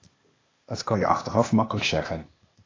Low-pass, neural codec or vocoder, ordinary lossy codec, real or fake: 7.2 kHz; codec, 16 kHz, 0.8 kbps, ZipCodec; AAC, 32 kbps; fake